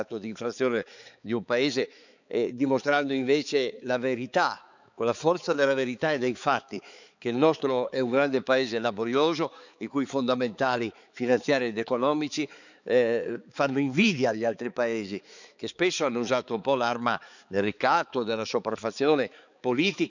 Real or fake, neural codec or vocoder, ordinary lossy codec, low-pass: fake; codec, 16 kHz, 4 kbps, X-Codec, HuBERT features, trained on balanced general audio; none; 7.2 kHz